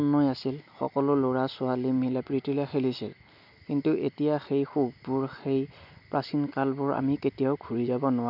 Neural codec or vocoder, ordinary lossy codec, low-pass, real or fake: none; none; 5.4 kHz; real